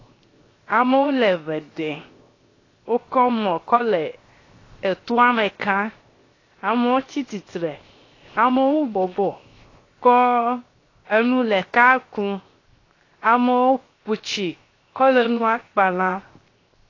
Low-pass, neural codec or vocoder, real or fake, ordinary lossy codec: 7.2 kHz; codec, 16 kHz, 0.7 kbps, FocalCodec; fake; AAC, 32 kbps